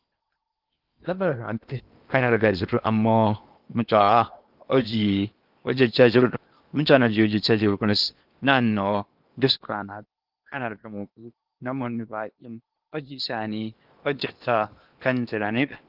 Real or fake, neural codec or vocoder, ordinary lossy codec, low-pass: fake; codec, 16 kHz in and 24 kHz out, 0.8 kbps, FocalCodec, streaming, 65536 codes; Opus, 32 kbps; 5.4 kHz